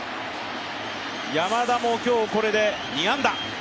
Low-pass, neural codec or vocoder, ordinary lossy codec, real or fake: none; none; none; real